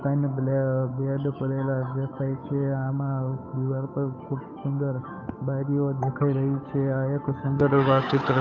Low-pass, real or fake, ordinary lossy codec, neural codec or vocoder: 7.2 kHz; fake; MP3, 48 kbps; codec, 16 kHz, 8 kbps, FunCodec, trained on Chinese and English, 25 frames a second